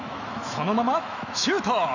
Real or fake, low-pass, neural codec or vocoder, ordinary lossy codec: fake; 7.2 kHz; autoencoder, 48 kHz, 128 numbers a frame, DAC-VAE, trained on Japanese speech; none